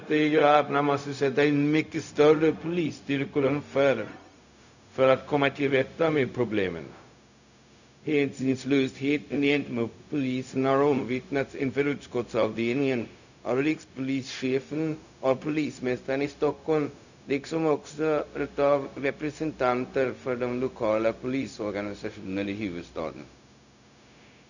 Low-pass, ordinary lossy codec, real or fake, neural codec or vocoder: 7.2 kHz; none; fake; codec, 16 kHz, 0.4 kbps, LongCat-Audio-Codec